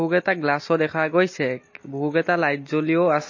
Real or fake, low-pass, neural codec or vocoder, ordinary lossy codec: real; 7.2 kHz; none; MP3, 32 kbps